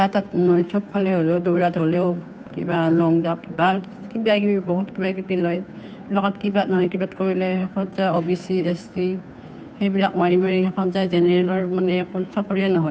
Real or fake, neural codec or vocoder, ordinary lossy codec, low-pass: fake; codec, 16 kHz, 2 kbps, FunCodec, trained on Chinese and English, 25 frames a second; none; none